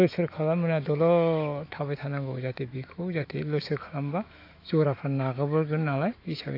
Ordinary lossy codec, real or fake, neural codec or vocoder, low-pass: AAC, 32 kbps; fake; autoencoder, 48 kHz, 128 numbers a frame, DAC-VAE, trained on Japanese speech; 5.4 kHz